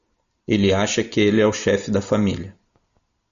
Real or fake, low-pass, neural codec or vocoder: real; 7.2 kHz; none